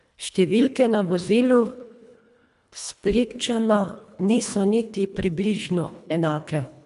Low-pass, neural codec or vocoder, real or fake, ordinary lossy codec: 10.8 kHz; codec, 24 kHz, 1.5 kbps, HILCodec; fake; none